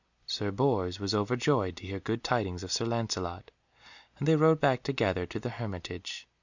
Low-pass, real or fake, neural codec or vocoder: 7.2 kHz; real; none